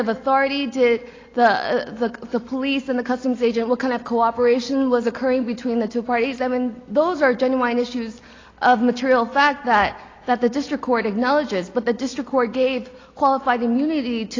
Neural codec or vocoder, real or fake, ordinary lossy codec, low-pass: none; real; AAC, 32 kbps; 7.2 kHz